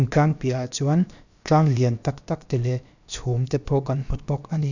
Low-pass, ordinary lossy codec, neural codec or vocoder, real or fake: 7.2 kHz; none; codec, 16 kHz, about 1 kbps, DyCAST, with the encoder's durations; fake